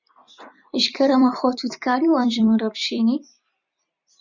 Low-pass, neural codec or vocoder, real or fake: 7.2 kHz; vocoder, 44.1 kHz, 128 mel bands every 256 samples, BigVGAN v2; fake